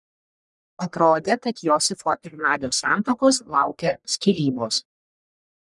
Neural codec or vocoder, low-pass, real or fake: codec, 44.1 kHz, 1.7 kbps, Pupu-Codec; 10.8 kHz; fake